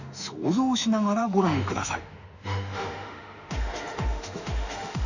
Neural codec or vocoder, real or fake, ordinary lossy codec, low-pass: autoencoder, 48 kHz, 32 numbers a frame, DAC-VAE, trained on Japanese speech; fake; none; 7.2 kHz